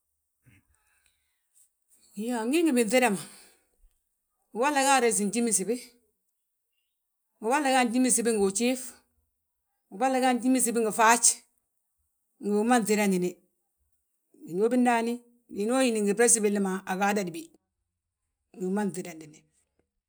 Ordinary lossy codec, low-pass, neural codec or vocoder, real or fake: none; none; none; real